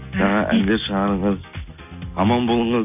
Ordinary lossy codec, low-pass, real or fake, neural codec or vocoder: none; 3.6 kHz; real; none